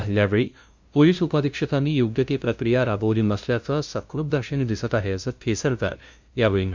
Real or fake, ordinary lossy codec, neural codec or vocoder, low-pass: fake; MP3, 64 kbps; codec, 16 kHz, 0.5 kbps, FunCodec, trained on LibriTTS, 25 frames a second; 7.2 kHz